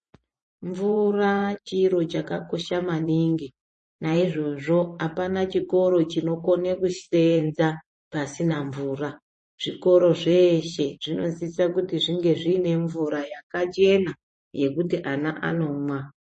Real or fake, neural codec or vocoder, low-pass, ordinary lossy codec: fake; vocoder, 24 kHz, 100 mel bands, Vocos; 10.8 kHz; MP3, 32 kbps